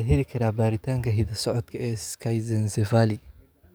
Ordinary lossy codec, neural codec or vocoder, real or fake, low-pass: none; codec, 44.1 kHz, 7.8 kbps, DAC; fake; none